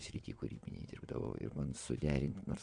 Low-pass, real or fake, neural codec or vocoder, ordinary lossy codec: 9.9 kHz; fake; vocoder, 22.05 kHz, 80 mel bands, WaveNeXt; MP3, 64 kbps